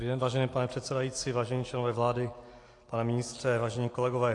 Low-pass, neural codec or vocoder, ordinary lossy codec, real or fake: 10.8 kHz; none; AAC, 48 kbps; real